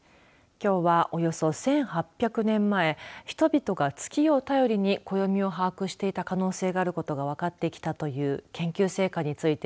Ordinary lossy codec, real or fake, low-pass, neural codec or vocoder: none; real; none; none